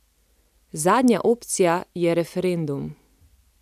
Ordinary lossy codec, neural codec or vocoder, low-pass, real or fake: none; none; 14.4 kHz; real